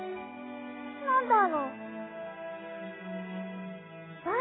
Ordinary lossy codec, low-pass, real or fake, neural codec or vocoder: AAC, 16 kbps; 7.2 kHz; real; none